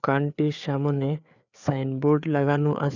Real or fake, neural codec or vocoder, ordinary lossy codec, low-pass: fake; codec, 16 kHz, 4 kbps, FreqCodec, larger model; none; 7.2 kHz